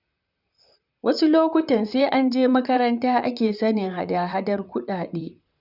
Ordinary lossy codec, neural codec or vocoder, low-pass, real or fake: none; vocoder, 44.1 kHz, 128 mel bands, Pupu-Vocoder; 5.4 kHz; fake